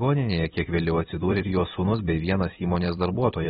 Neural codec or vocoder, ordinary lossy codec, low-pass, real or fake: vocoder, 44.1 kHz, 128 mel bands every 256 samples, BigVGAN v2; AAC, 16 kbps; 19.8 kHz; fake